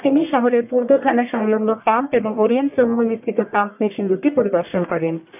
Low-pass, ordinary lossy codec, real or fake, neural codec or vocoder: 3.6 kHz; none; fake; codec, 44.1 kHz, 1.7 kbps, Pupu-Codec